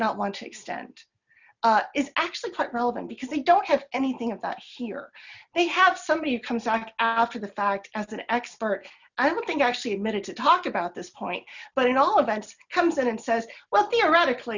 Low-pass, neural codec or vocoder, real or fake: 7.2 kHz; none; real